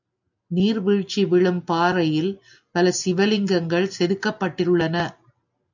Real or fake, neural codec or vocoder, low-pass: real; none; 7.2 kHz